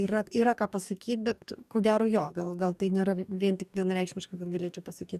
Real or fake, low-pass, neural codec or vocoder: fake; 14.4 kHz; codec, 44.1 kHz, 2.6 kbps, DAC